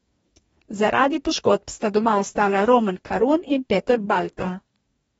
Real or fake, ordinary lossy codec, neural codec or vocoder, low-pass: fake; AAC, 24 kbps; codec, 44.1 kHz, 2.6 kbps, DAC; 19.8 kHz